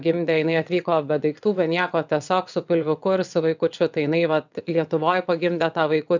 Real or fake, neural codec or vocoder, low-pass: fake; vocoder, 22.05 kHz, 80 mel bands, WaveNeXt; 7.2 kHz